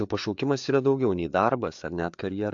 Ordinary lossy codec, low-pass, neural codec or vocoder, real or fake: AAC, 64 kbps; 7.2 kHz; codec, 16 kHz, 4 kbps, FreqCodec, larger model; fake